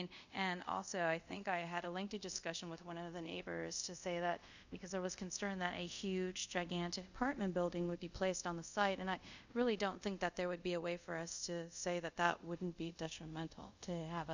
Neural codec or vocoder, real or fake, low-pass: codec, 24 kHz, 0.5 kbps, DualCodec; fake; 7.2 kHz